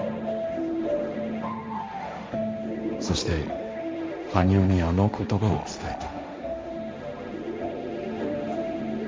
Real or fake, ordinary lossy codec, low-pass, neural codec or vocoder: fake; none; none; codec, 16 kHz, 1.1 kbps, Voila-Tokenizer